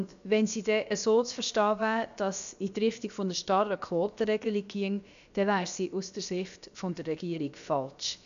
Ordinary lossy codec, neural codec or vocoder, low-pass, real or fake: none; codec, 16 kHz, about 1 kbps, DyCAST, with the encoder's durations; 7.2 kHz; fake